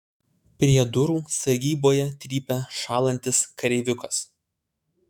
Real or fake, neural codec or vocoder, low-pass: fake; autoencoder, 48 kHz, 128 numbers a frame, DAC-VAE, trained on Japanese speech; 19.8 kHz